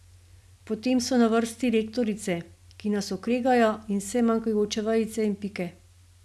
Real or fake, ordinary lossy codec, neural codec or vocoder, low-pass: real; none; none; none